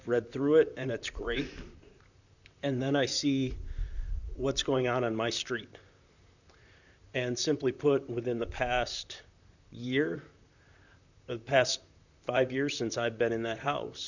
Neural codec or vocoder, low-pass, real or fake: vocoder, 44.1 kHz, 128 mel bands, Pupu-Vocoder; 7.2 kHz; fake